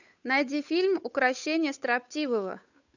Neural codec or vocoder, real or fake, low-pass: codec, 16 kHz, 8 kbps, FunCodec, trained on Chinese and English, 25 frames a second; fake; 7.2 kHz